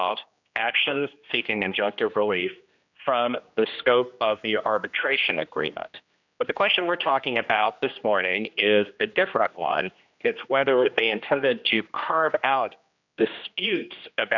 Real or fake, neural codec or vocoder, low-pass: fake; codec, 16 kHz, 1 kbps, X-Codec, HuBERT features, trained on general audio; 7.2 kHz